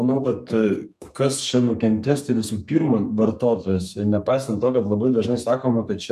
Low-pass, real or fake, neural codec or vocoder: 14.4 kHz; fake; codec, 32 kHz, 1.9 kbps, SNAC